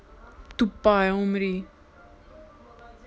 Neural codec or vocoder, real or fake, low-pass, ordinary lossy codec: none; real; none; none